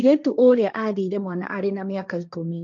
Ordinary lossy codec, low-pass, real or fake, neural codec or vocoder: none; 7.2 kHz; fake; codec, 16 kHz, 1.1 kbps, Voila-Tokenizer